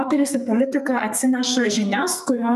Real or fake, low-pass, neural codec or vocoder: fake; 14.4 kHz; codec, 44.1 kHz, 2.6 kbps, SNAC